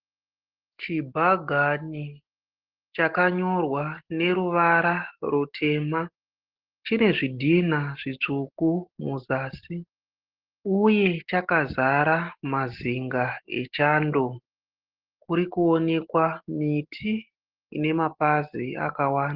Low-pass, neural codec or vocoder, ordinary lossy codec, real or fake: 5.4 kHz; none; Opus, 16 kbps; real